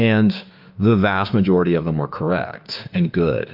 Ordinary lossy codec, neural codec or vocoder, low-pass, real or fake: Opus, 24 kbps; autoencoder, 48 kHz, 32 numbers a frame, DAC-VAE, trained on Japanese speech; 5.4 kHz; fake